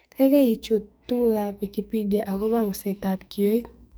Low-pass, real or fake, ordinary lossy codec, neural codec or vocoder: none; fake; none; codec, 44.1 kHz, 2.6 kbps, SNAC